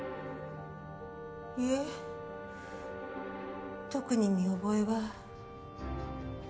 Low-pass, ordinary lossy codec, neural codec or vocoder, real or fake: none; none; none; real